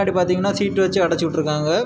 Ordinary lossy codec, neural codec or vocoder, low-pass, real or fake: none; none; none; real